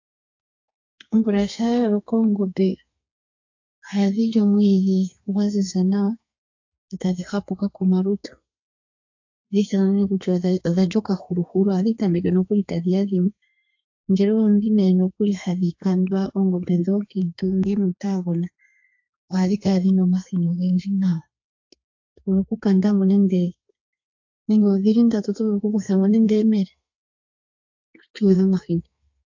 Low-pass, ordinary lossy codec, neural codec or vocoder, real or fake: 7.2 kHz; AAC, 48 kbps; codec, 32 kHz, 1.9 kbps, SNAC; fake